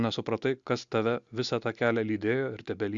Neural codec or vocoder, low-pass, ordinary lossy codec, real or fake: none; 7.2 kHz; Opus, 64 kbps; real